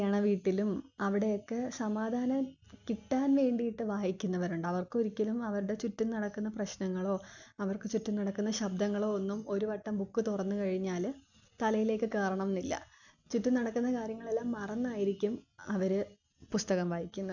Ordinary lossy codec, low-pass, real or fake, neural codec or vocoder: none; 7.2 kHz; real; none